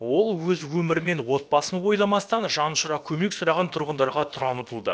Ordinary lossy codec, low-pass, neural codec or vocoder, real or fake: none; none; codec, 16 kHz, about 1 kbps, DyCAST, with the encoder's durations; fake